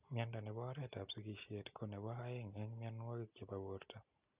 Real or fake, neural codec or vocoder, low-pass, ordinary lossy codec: real; none; 3.6 kHz; Opus, 64 kbps